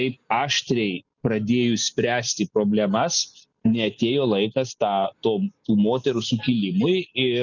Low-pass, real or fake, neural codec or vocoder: 7.2 kHz; real; none